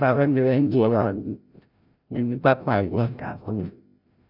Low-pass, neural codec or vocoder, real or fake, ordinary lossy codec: 5.4 kHz; codec, 16 kHz, 0.5 kbps, FreqCodec, larger model; fake; none